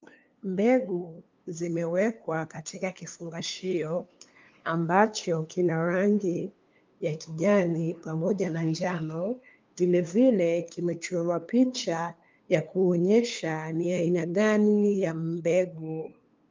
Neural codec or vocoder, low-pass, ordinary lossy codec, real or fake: codec, 16 kHz, 2 kbps, FunCodec, trained on LibriTTS, 25 frames a second; 7.2 kHz; Opus, 24 kbps; fake